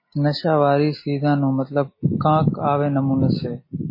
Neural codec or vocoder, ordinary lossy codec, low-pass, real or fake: none; MP3, 24 kbps; 5.4 kHz; real